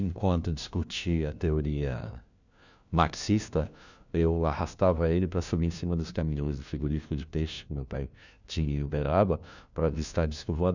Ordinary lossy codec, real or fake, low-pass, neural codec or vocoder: none; fake; 7.2 kHz; codec, 16 kHz, 1 kbps, FunCodec, trained on LibriTTS, 50 frames a second